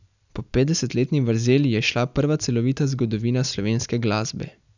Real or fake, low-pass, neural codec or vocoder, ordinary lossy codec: real; 7.2 kHz; none; none